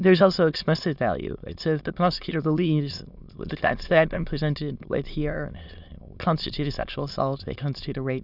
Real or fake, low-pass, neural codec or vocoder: fake; 5.4 kHz; autoencoder, 22.05 kHz, a latent of 192 numbers a frame, VITS, trained on many speakers